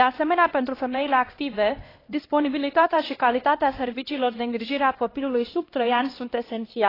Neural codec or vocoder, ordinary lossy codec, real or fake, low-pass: codec, 16 kHz, 1 kbps, X-Codec, HuBERT features, trained on LibriSpeech; AAC, 24 kbps; fake; 5.4 kHz